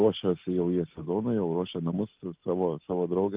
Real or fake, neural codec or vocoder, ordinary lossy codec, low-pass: real; none; Opus, 32 kbps; 3.6 kHz